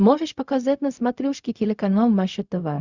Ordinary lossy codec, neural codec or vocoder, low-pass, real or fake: Opus, 64 kbps; codec, 16 kHz, 0.4 kbps, LongCat-Audio-Codec; 7.2 kHz; fake